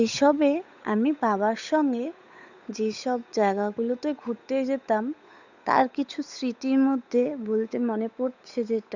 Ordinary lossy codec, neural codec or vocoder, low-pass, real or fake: none; codec, 16 kHz, 8 kbps, FunCodec, trained on Chinese and English, 25 frames a second; 7.2 kHz; fake